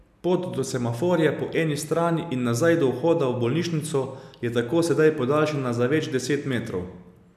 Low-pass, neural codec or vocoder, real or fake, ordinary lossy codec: 14.4 kHz; none; real; none